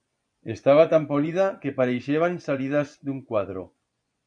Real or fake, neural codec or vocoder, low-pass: fake; vocoder, 24 kHz, 100 mel bands, Vocos; 9.9 kHz